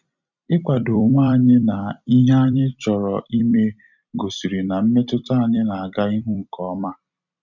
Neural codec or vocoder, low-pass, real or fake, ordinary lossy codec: vocoder, 44.1 kHz, 128 mel bands every 256 samples, BigVGAN v2; 7.2 kHz; fake; none